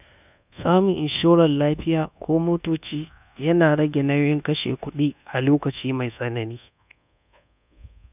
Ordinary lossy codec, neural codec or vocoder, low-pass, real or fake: none; codec, 24 kHz, 1.2 kbps, DualCodec; 3.6 kHz; fake